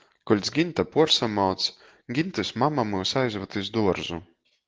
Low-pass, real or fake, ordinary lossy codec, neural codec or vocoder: 7.2 kHz; real; Opus, 16 kbps; none